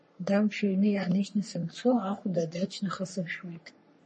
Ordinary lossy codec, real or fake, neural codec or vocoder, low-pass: MP3, 32 kbps; fake; codec, 44.1 kHz, 3.4 kbps, Pupu-Codec; 10.8 kHz